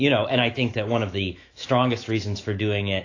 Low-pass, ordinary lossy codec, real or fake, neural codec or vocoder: 7.2 kHz; AAC, 32 kbps; fake; autoencoder, 48 kHz, 128 numbers a frame, DAC-VAE, trained on Japanese speech